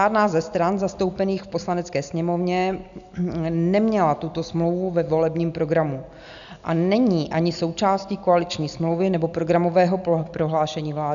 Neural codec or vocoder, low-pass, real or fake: none; 7.2 kHz; real